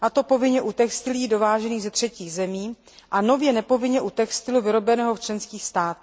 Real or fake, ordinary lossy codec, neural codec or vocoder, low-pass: real; none; none; none